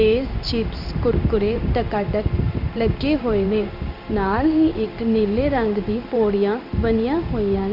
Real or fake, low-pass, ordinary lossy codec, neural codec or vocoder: fake; 5.4 kHz; none; codec, 16 kHz in and 24 kHz out, 1 kbps, XY-Tokenizer